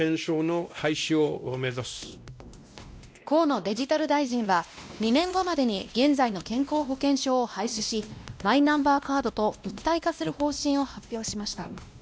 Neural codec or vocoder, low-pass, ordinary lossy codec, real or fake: codec, 16 kHz, 1 kbps, X-Codec, WavLM features, trained on Multilingual LibriSpeech; none; none; fake